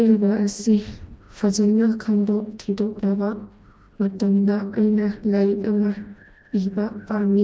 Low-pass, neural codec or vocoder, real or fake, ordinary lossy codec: none; codec, 16 kHz, 1 kbps, FreqCodec, smaller model; fake; none